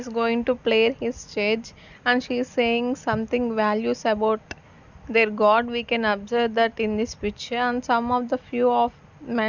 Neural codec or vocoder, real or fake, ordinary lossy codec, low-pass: none; real; none; 7.2 kHz